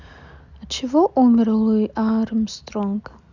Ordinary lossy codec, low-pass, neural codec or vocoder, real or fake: Opus, 64 kbps; 7.2 kHz; none; real